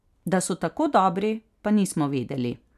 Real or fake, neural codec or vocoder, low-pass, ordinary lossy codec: real; none; 14.4 kHz; none